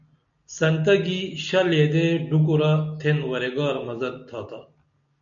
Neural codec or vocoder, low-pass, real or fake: none; 7.2 kHz; real